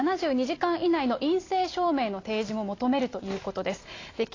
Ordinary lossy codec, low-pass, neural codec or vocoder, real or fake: AAC, 32 kbps; 7.2 kHz; none; real